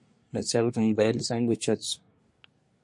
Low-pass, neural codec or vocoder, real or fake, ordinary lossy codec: 10.8 kHz; codec, 24 kHz, 1 kbps, SNAC; fake; MP3, 48 kbps